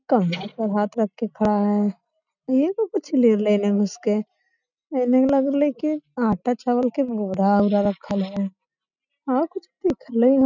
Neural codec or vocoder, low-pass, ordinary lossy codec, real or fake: none; 7.2 kHz; none; real